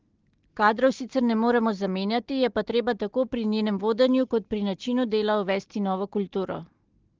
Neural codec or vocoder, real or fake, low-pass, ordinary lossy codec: none; real; 7.2 kHz; Opus, 16 kbps